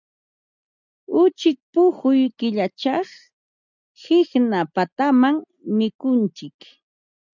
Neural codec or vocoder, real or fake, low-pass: none; real; 7.2 kHz